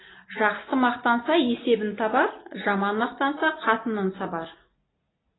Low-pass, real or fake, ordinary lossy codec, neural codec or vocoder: 7.2 kHz; real; AAC, 16 kbps; none